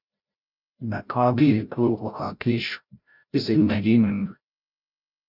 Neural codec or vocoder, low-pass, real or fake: codec, 16 kHz, 0.5 kbps, FreqCodec, larger model; 5.4 kHz; fake